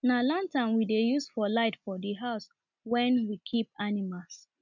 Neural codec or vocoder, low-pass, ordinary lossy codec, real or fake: none; 7.2 kHz; none; real